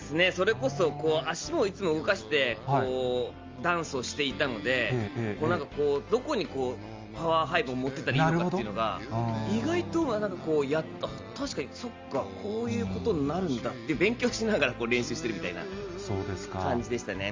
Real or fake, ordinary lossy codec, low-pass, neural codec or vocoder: real; Opus, 32 kbps; 7.2 kHz; none